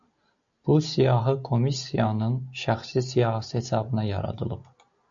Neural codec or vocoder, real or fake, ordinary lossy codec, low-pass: none; real; AAC, 64 kbps; 7.2 kHz